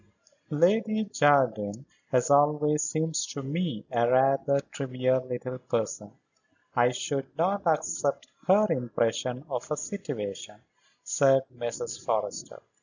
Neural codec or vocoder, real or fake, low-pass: none; real; 7.2 kHz